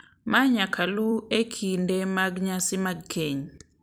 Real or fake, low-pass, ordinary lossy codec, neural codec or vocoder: fake; none; none; vocoder, 44.1 kHz, 128 mel bands every 256 samples, BigVGAN v2